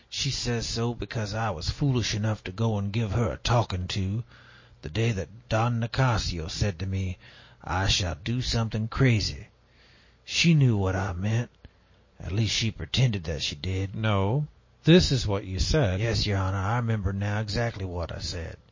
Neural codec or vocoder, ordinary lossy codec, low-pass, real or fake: none; MP3, 32 kbps; 7.2 kHz; real